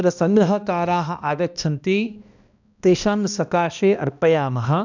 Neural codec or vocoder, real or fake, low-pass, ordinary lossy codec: codec, 16 kHz, 1 kbps, X-Codec, HuBERT features, trained on balanced general audio; fake; 7.2 kHz; none